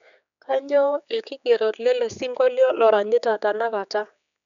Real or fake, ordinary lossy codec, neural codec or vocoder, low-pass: fake; none; codec, 16 kHz, 4 kbps, X-Codec, HuBERT features, trained on general audio; 7.2 kHz